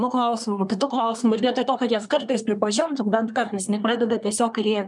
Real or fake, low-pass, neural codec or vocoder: fake; 10.8 kHz; codec, 24 kHz, 1 kbps, SNAC